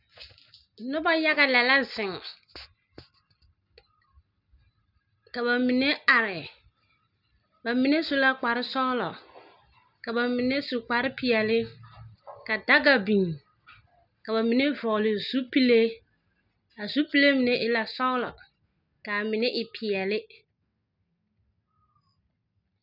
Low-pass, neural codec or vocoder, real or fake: 5.4 kHz; none; real